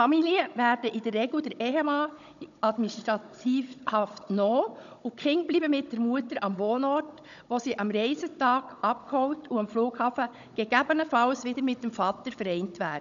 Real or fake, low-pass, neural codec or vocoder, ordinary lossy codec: fake; 7.2 kHz; codec, 16 kHz, 16 kbps, FunCodec, trained on Chinese and English, 50 frames a second; none